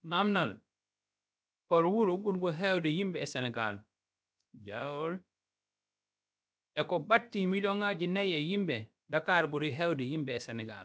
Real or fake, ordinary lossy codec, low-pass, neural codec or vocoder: fake; none; none; codec, 16 kHz, about 1 kbps, DyCAST, with the encoder's durations